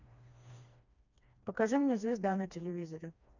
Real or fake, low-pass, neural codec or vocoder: fake; 7.2 kHz; codec, 16 kHz, 2 kbps, FreqCodec, smaller model